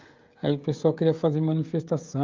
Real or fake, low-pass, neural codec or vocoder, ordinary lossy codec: fake; 7.2 kHz; codec, 16 kHz, 16 kbps, FreqCodec, smaller model; Opus, 32 kbps